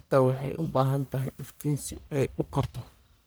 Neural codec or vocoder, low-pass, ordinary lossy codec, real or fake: codec, 44.1 kHz, 1.7 kbps, Pupu-Codec; none; none; fake